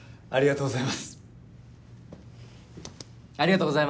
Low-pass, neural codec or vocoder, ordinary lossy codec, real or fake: none; none; none; real